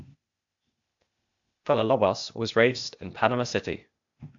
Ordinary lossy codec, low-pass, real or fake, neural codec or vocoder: AAC, 64 kbps; 7.2 kHz; fake; codec, 16 kHz, 0.8 kbps, ZipCodec